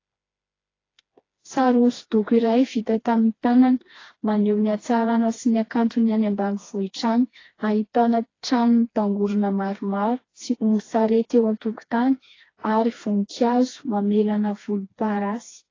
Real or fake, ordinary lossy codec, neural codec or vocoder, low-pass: fake; AAC, 32 kbps; codec, 16 kHz, 2 kbps, FreqCodec, smaller model; 7.2 kHz